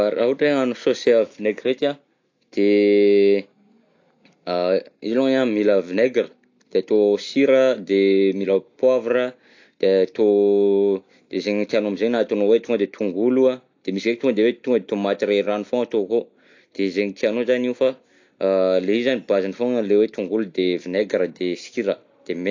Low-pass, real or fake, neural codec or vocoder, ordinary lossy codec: 7.2 kHz; real; none; AAC, 48 kbps